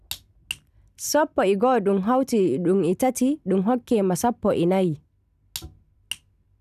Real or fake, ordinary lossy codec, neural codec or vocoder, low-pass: real; none; none; 14.4 kHz